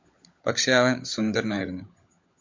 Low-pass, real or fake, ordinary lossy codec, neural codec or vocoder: 7.2 kHz; fake; MP3, 48 kbps; codec, 16 kHz, 16 kbps, FunCodec, trained on LibriTTS, 50 frames a second